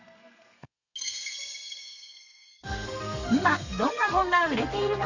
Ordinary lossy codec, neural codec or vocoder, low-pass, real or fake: none; codec, 32 kHz, 1.9 kbps, SNAC; 7.2 kHz; fake